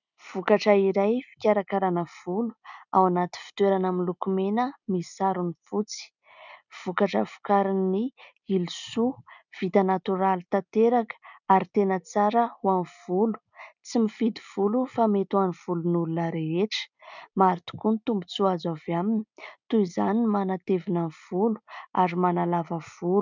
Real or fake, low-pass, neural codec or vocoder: real; 7.2 kHz; none